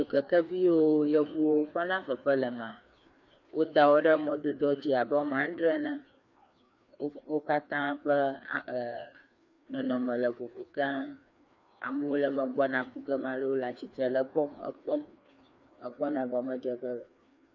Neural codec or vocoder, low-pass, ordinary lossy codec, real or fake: codec, 16 kHz, 2 kbps, FreqCodec, larger model; 7.2 kHz; MP3, 48 kbps; fake